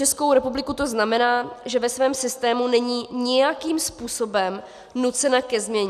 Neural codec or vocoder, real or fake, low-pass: none; real; 14.4 kHz